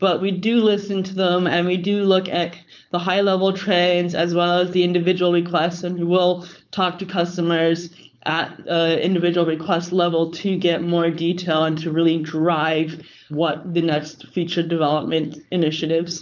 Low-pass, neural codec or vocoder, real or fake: 7.2 kHz; codec, 16 kHz, 4.8 kbps, FACodec; fake